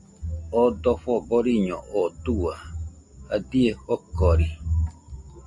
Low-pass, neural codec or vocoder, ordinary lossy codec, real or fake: 10.8 kHz; none; MP3, 48 kbps; real